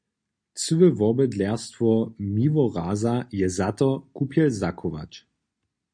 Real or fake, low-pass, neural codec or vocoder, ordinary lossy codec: real; 9.9 kHz; none; MP3, 48 kbps